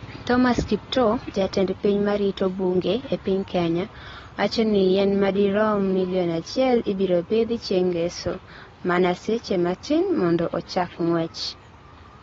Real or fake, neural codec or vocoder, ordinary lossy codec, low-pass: real; none; AAC, 24 kbps; 7.2 kHz